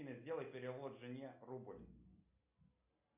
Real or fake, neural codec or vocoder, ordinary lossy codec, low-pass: real; none; MP3, 32 kbps; 3.6 kHz